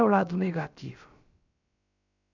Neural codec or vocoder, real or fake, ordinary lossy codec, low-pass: codec, 16 kHz, about 1 kbps, DyCAST, with the encoder's durations; fake; none; 7.2 kHz